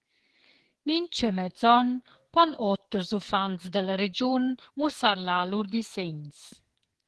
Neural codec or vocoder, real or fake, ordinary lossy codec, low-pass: codec, 44.1 kHz, 2.6 kbps, SNAC; fake; Opus, 16 kbps; 10.8 kHz